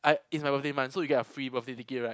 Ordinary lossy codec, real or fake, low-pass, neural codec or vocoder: none; real; none; none